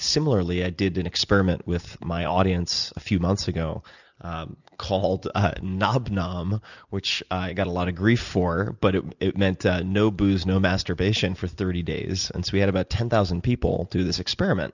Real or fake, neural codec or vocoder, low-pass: real; none; 7.2 kHz